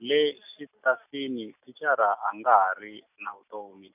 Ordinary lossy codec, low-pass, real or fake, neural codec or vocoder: none; 3.6 kHz; real; none